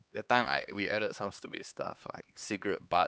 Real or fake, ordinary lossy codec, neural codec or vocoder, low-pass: fake; none; codec, 16 kHz, 2 kbps, X-Codec, HuBERT features, trained on LibriSpeech; none